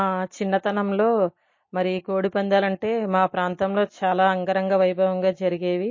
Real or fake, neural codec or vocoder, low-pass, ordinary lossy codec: real; none; 7.2 kHz; MP3, 32 kbps